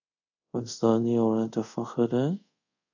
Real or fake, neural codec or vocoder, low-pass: fake; codec, 24 kHz, 0.5 kbps, DualCodec; 7.2 kHz